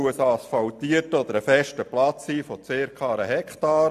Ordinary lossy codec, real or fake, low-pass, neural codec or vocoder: AAC, 96 kbps; real; 14.4 kHz; none